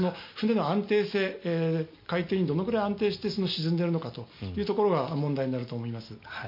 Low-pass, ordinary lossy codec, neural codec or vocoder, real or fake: 5.4 kHz; MP3, 32 kbps; none; real